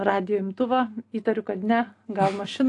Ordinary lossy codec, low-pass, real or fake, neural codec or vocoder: AAC, 48 kbps; 10.8 kHz; real; none